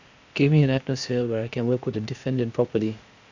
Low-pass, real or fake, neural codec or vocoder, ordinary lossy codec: 7.2 kHz; fake; codec, 16 kHz, 0.8 kbps, ZipCodec; Opus, 64 kbps